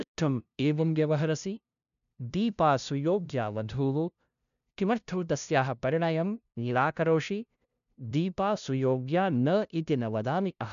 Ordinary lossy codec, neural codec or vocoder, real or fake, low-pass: none; codec, 16 kHz, 0.5 kbps, FunCodec, trained on LibriTTS, 25 frames a second; fake; 7.2 kHz